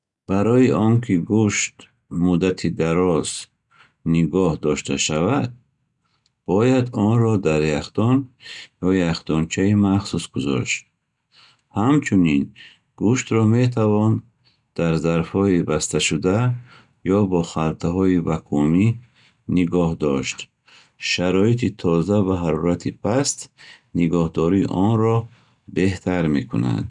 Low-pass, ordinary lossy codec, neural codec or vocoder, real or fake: 10.8 kHz; none; none; real